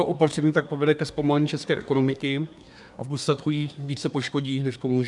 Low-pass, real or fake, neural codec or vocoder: 10.8 kHz; fake; codec, 24 kHz, 1 kbps, SNAC